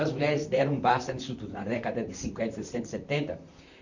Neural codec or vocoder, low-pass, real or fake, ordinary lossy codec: vocoder, 44.1 kHz, 128 mel bands, Pupu-Vocoder; 7.2 kHz; fake; none